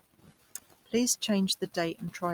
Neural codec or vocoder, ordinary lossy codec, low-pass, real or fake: none; Opus, 32 kbps; 19.8 kHz; real